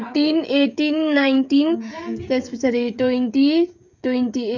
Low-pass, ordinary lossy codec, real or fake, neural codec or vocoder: 7.2 kHz; none; fake; codec, 16 kHz, 16 kbps, FreqCodec, smaller model